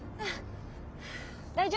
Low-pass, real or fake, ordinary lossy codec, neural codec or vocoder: none; real; none; none